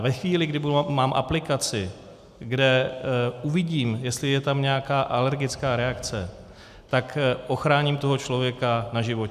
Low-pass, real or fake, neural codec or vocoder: 14.4 kHz; real; none